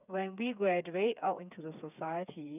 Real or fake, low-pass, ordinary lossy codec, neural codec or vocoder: fake; 3.6 kHz; none; codec, 16 kHz, 4 kbps, FreqCodec, smaller model